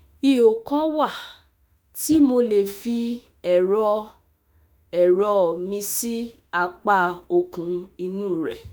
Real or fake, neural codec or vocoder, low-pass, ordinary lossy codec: fake; autoencoder, 48 kHz, 32 numbers a frame, DAC-VAE, trained on Japanese speech; none; none